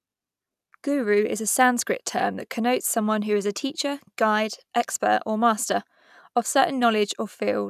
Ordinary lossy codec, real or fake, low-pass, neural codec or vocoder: none; real; 14.4 kHz; none